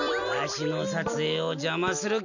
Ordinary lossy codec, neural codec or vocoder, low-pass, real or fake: none; none; 7.2 kHz; real